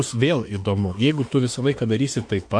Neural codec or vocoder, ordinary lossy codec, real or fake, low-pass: autoencoder, 48 kHz, 32 numbers a frame, DAC-VAE, trained on Japanese speech; MP3, 48 kbps; fake; 9.9 kHz